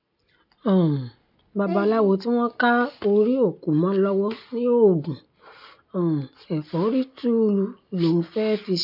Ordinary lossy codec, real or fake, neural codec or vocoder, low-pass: none; real; none; 5.4 kHz